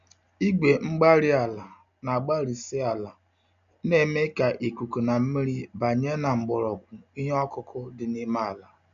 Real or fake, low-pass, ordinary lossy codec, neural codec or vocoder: real; 7.2 kHz; none; none